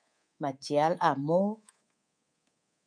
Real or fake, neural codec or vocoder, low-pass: fake; codec, 24 kHz, 3.1 kbps, DualCodec; 9.9 kHz